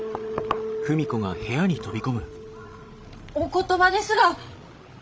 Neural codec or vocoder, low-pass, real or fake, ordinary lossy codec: codec, 16 kHz, 16 kbps, FreqCodec, larger model; none; fake; none